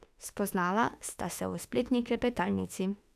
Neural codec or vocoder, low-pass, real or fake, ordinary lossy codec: autoencoder, 48 kHz, 32 numbers a frame, DAC-VAE, trained on Japanese speech; 14.4 kHz; fake; none